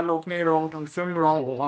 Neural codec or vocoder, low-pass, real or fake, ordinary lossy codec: codec, 16 kHz, 1 kbps, X-Codec, HuBERT features, trained on general audio; none; fake; none